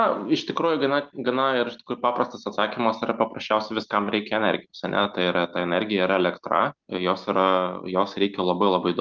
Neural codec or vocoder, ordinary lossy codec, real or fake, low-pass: none; Opus, 24 kbps; real; 7.2 kHz